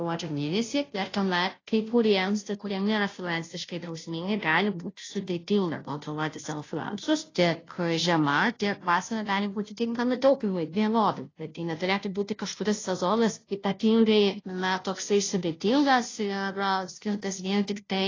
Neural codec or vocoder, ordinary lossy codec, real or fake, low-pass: codec, 16 kHz, 0.5 kbps, FunCodec, trained on Chinese and English, 25 frames a second; AAC, 32 kbps; fake; 7.2 kHz